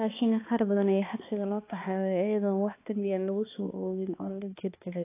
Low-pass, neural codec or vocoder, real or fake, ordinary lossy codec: 3.6 kHz; codec, 16 kHz, 2 kbps, X-Codec, HuBERT features, trained on balanced general audio; fake; AAC, 24 kbps